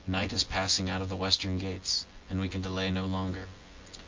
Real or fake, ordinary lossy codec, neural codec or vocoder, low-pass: fake; Opus, 32 kbps; vocoder, 24 kHz, 100 mel bands, Vocos; 7.2 kHz